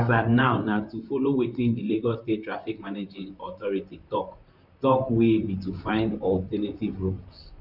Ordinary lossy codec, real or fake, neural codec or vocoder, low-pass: none; fake; vocoder, 44.1 kHz, 128 mel bands, Pupu-Vocoder; 5.4 kHz